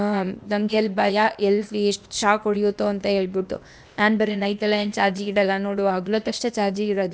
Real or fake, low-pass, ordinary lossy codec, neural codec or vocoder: fake; none; none; codec, 16 kHz, 0.8 kbps, ZipCodec